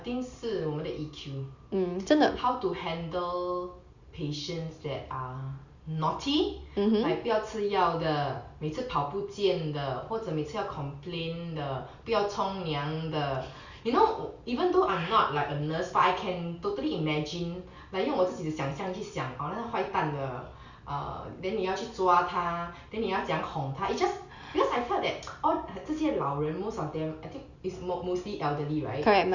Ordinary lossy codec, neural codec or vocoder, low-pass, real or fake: Opus, 64 kbps; none; 7.2 kHz; real